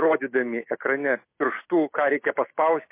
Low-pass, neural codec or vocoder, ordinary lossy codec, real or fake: 3.6 kHz; none; MP3, 24 kbps; real